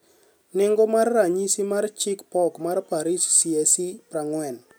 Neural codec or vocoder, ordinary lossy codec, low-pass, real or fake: none; none; none; real